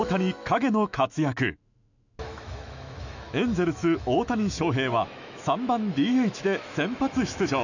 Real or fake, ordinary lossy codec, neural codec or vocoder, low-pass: fake; none; autoencoder, 48 kHz, 128 numbers a frame, DAC-VAE, trained on Japanese speech; 7.2 kHz